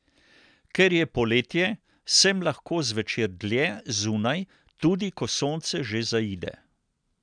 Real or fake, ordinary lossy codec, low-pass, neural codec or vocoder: real; none; 9.9 kHz; none